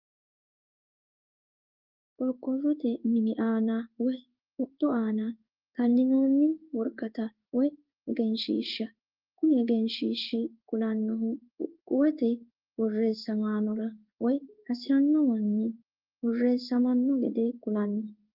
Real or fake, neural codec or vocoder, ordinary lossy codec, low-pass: fake; codec, 16 kHz in and 24 kHz out, 1 kbps, XY-Tokenizer; Opus, 32 kbps; 5.4 kHz